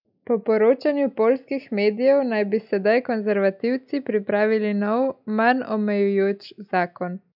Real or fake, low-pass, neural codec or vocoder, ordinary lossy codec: real; 5.4 kHz; none; none